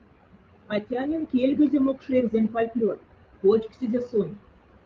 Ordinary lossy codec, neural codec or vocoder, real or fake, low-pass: Opus, 32 kbps; codec, 16 kHz, 16 kbps, FreqCodec, larger model; fake; 7.2 kHz